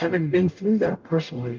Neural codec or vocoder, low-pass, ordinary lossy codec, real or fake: codec, 44.1 kHz, 0.9 kbps, DAC; 7.2 kHz; Opus, 32 kbps; fake